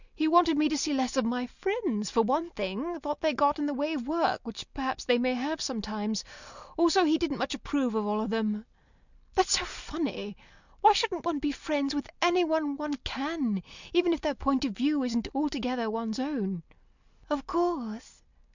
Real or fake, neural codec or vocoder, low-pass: real; none; 7.2 kHz